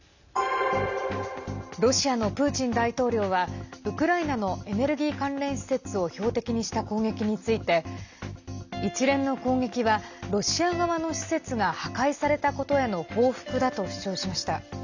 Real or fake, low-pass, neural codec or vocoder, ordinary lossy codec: real; 7.2 kHz; none; none